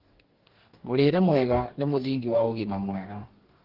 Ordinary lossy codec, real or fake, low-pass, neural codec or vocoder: Opus, 16 kbps; fake; 5.4 kHz; codec, 44.1 kHz, 2.6 kbps, DAC